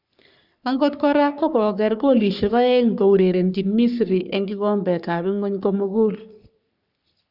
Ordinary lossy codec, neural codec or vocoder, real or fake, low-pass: none; codec, 44.1 kHz, 3.4 kbps, Pupu-Codec; fake; 5.4 kHz